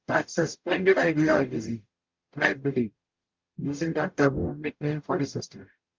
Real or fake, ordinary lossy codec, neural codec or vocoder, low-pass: fake; Opus, 32 kbps; codec, 44.1 kHz, 0.9 kbps, DAC; 7.2 kHz